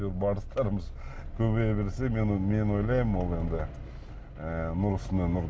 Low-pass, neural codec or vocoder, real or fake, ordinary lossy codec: none; none; real; none